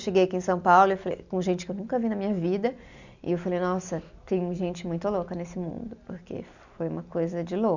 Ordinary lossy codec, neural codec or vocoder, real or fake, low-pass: none; none; real; 7.2 kHz